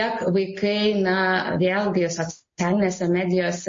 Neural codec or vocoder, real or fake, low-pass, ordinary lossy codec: none; real; 7.2 kHz; MP3, 32 kbps